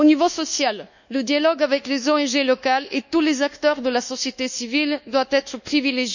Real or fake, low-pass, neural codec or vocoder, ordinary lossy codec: fake; 7.2 kHz; codec, 24 kHz, 1.2 kbps, DualCodec; none